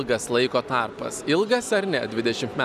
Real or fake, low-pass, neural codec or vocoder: real; 14.4 kHz; none